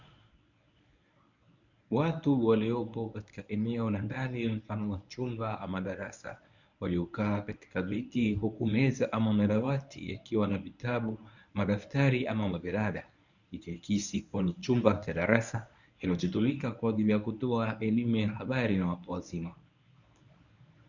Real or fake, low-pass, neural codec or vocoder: fake; 7.2 kHz; codec, 24 kHz, 0.9 kbps, WavTokenizer, medium speech release version 1